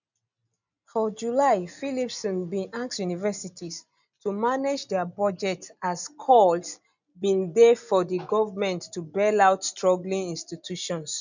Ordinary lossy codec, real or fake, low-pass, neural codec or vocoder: none; real; 7.2 kHz; none